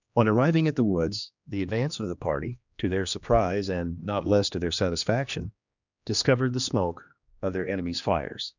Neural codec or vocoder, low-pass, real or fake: codec, 16 kHz, 2 kbps, X-Codec, HuBERT features, trained on general audio; 7.2 kHz; fake